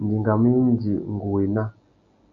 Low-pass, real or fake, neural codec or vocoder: 7.2 kHz; real; none